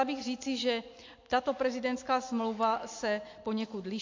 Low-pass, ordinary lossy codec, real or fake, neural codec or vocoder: 7.2 kHz; MP3, 48 kbps; real; none